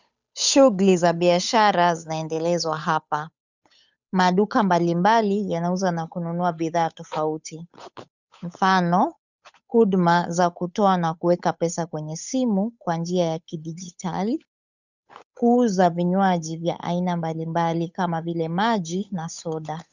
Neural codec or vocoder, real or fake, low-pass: codec, 16 kHz, 8 kbps, FunCodec, trained on Chinese and English, 25 frames a second; fake; 7.2 kHz